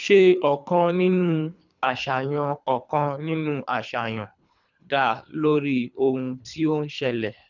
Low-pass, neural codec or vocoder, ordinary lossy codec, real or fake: 7.2 kHz; codec, 24 kHz, 3 kbps, HILCodec; none; fake